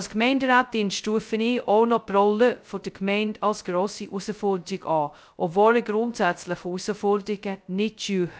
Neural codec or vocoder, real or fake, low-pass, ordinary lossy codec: codec, 16 kHz, 0.2 kbps, FocalCodec; fake; none; none